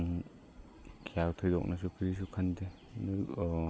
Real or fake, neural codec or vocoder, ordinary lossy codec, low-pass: real; none; none; none